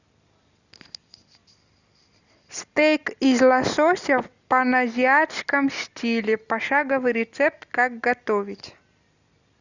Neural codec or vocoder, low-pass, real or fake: none; 7.2 kHz; real